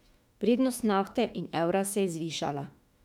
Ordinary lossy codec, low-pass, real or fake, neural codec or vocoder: none; 19.8 kHz; fake; autoencoder, 48 kHz, 32 numbers a frame, DAC-VAE, trained on Japanese speech